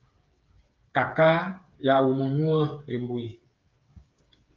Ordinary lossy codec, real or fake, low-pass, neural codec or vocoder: Opus, 32 kbps; fake; 7.2 kHz; codec, 16 kHz, 8 kbps, FreqCodec, smaller model